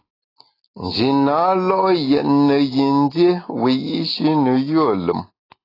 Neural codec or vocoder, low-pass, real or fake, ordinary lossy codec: none; 5.4 kHz; real; AAC, 24 kbps